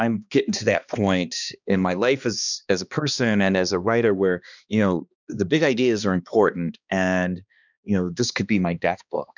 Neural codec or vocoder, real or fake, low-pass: codec, 16 kHz, 2 kbps, X-Codec, HuBERT features, trained on balanced general audio; fake; 7.2 kHz